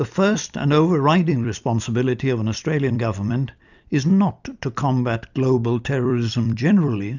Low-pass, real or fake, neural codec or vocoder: 7.2 kHz; fake; vocoder, 44.1 kHz, 128 mel bands every 256 samples, BigVGAN v2